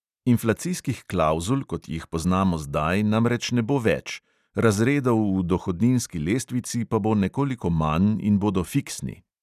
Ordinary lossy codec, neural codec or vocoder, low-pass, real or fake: none; none; 14.4 kHz; real